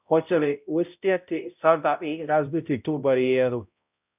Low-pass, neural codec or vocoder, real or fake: 3.6 kHz; codec, 16 kHz, 0.5 kbps, X-Codec, HuBERT features, trained on balanced general audio; fake